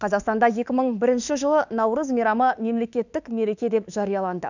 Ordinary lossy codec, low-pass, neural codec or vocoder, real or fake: none; 7.2 kHz; autoencoder, 48 kHz, 32 numbers a frame, DAC-VAE, trained on Japanese speech; fake